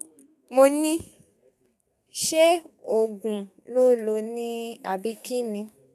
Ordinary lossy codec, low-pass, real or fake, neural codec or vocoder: none; 14.4 kHz; fake; codec, 32 kHz, 1.9 kbps, SNAC